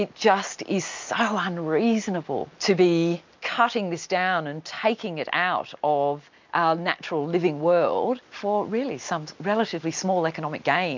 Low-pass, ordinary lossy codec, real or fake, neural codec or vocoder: 7.2 kHz; MP3, 64 kbps; real; none